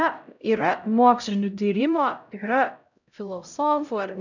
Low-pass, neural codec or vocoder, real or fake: 7.2 kHz; codec, 16 kHz, 0.5 kbps, X-Codec, HuBERT features, trained on LibriSpeech; fake